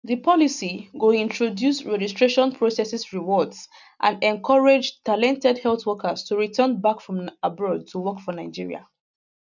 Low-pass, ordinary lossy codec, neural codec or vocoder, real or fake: 7.2 kHz; none; none; real